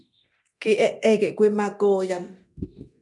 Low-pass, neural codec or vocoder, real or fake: 10.8 kHz; codec, 24 kHz, 0.9 kbps, DualCodec; fake